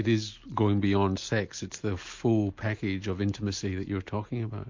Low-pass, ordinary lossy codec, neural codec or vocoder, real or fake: 7.2 kHz; MP3, 48 kbps; none; real